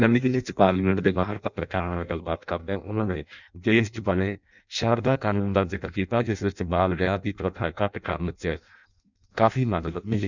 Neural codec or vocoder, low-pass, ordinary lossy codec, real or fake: codec, 16 kHz in and 24 kHz out, 0.6 kbps, FireRedTTS-2 codec; 7.2 kHz; none; fake